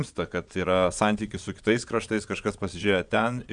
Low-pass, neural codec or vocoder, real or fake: 9.9 kHz; vocoder, 22.05 kHz, 80 mel bands, Vocos; fake